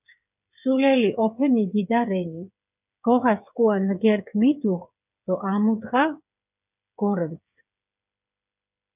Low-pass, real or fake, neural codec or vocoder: 3.6 kHz; fake; codec, 16 kHz, 8 kbps, FreqCodec, smaller model